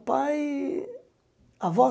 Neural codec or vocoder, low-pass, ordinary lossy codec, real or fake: none; none; none; real